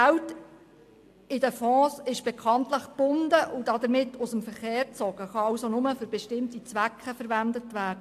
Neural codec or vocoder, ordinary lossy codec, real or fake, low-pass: none; AAC, 64 kbps; real; 14.4 kHz